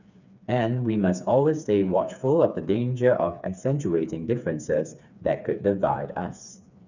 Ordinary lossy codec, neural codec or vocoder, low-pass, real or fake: none; codec, 16 kHz, 4 kbps, FreqCodec, smaller model; 7.2 kHz; fake